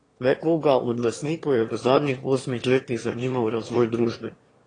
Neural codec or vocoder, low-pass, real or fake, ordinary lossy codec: autoencoder, 22.05 kHz, a latent of 192 numbers a frame, VITS, trained on one speaker; 9.9 kHz; fake; AAC, 32 kbps